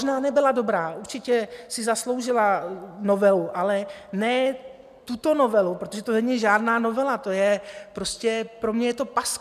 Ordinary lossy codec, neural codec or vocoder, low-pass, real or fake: MP3, 96 kbps; none; 14.4 kHz; real